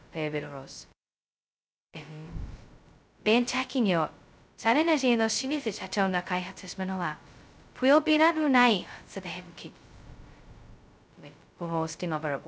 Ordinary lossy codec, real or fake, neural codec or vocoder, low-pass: none; fake; codec, 16 kHz, 0.2 kbps, FocalCodec; none